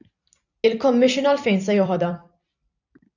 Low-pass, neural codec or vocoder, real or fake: 7.2 kHz; vocoder, 44.1 kHz, 128 mel bands every 256 samples, BigVGAN v2; fake